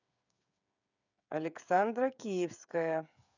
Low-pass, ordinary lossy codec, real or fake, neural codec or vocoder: 7.2 kHz; none; fake; codec, 16 kHz, 8 kbps, FreqCodec, smaller model